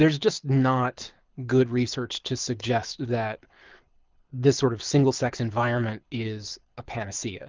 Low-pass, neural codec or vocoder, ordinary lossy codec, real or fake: 7.2 kHz; codec, 24 kHz, 6 kbps, HILCodec; Opus, 32 kbps; fake